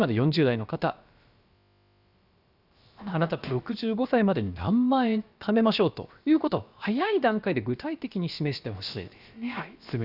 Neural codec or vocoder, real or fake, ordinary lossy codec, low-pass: codec, 16 kHz, about 1 kbps, DyCAST, with the encoder's durations; fake; Opus, 64 kbps; 5.4 kHz